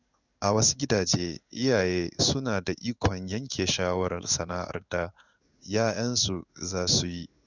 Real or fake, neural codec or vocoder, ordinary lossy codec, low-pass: fake; codec, 16 kHz in and 24 kHz out, 1 kbps, XY-Tokenizer; none; 7.2 kHz